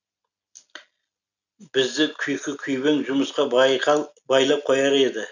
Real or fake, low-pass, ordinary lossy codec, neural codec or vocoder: real; 7.2 kHz; none; none